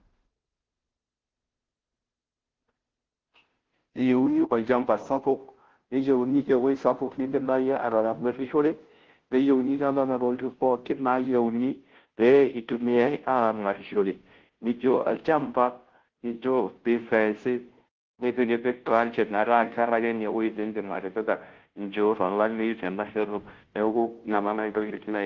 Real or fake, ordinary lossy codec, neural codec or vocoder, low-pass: fake; Opus, 16 kbps; codec, 16 kHz, 0.5 kbps, FunCodec, trained on Chinese and English, 25 frames a second; 7.2 kHz